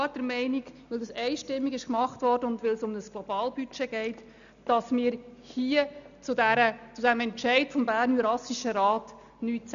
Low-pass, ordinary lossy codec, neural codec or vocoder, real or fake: 7.2 kHz; none; none; real